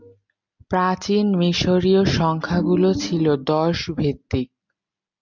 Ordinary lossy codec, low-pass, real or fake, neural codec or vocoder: MP3, 64 kbps; 7.2 kHz; real; none